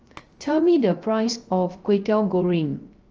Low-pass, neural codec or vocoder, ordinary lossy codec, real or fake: 7.2 kHz; codec, 16 kHz, 0.3 kbps, FocalCodec; Opus, 24 kbps; fake